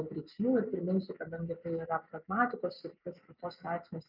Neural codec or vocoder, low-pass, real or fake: none; 5.4 kHz; real